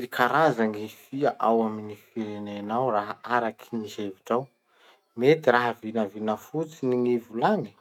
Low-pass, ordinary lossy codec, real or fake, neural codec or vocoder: 19.8 kHz; none; fake; autoencoder, 48 kHz, 128 numbers a frame, DAC-VAE, trained on Japanese speech